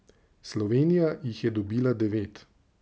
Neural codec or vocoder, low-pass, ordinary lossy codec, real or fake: none; none; none; real